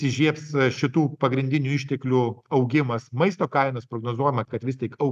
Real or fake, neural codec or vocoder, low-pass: real; none; 14.4 kHz